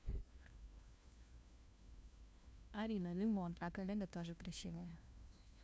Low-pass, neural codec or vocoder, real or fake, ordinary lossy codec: none; codec, 16 kHz, 1 kbps, FunCodec, trained on LibriTTS, 50 frames a second; fake; none